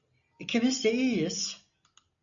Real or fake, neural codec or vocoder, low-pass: real; none; 7.2 kHz